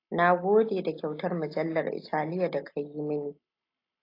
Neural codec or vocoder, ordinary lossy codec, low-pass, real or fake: none; AAC, 32 kbps; 5.4 kHz; real